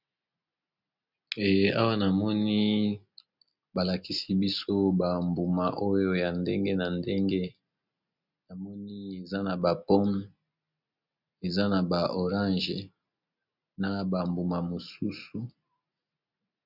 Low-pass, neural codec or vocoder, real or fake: 5.4 kHz; none; real